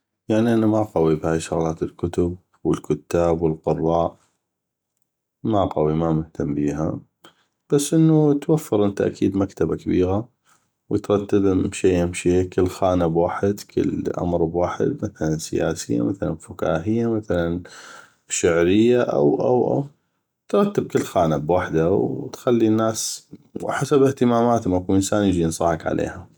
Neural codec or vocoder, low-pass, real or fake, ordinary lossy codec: none; none; real; none